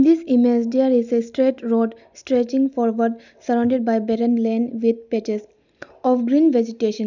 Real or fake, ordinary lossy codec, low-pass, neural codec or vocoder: real; none; 7.2 kHz; none